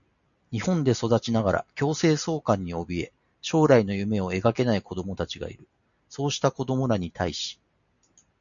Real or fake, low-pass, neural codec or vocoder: real; 7.2 kHz; none